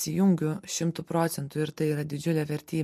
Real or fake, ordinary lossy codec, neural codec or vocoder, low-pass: real; MP3, 64 kbps; none; 14.4 kHz